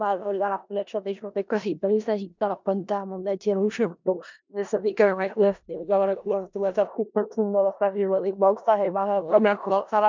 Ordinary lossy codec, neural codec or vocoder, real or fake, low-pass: MP3, 64 kbps; codec, 16 kHz in and 24 kHz out, 0.4 kbps, LongCat-Audio-Codec, four codebook decoder; fake; 7.2 kHz